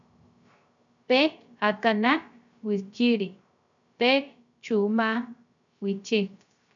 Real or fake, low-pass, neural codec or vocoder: fake; 7.2 kHz; codec, 16 kHz, 0.3 kbps, FocalCodec